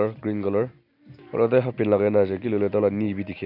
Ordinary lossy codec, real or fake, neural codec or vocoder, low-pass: none; real; none; 5.4 kHz